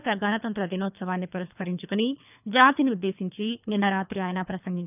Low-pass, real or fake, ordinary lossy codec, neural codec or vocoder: 3.6 kHz; fake; none; codec, 24 kHz, 3 kbps, HILCodec